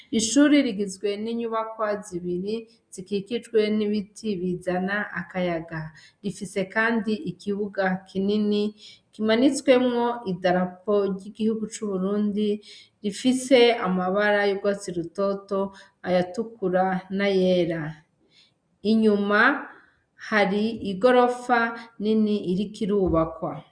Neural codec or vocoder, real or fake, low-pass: none; real; 9.9 kHz